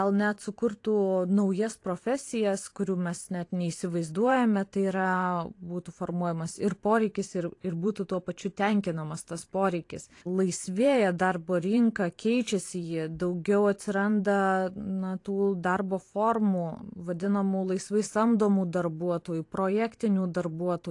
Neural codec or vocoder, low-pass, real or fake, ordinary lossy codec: vocoder, 44.1 kHz, 128 mel bands every 512 samples, BigVGAN v2; 10.8 kHz; fake; AAC, 48 kbps